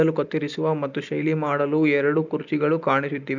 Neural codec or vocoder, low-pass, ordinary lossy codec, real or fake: none; 7.2 kHz; none; real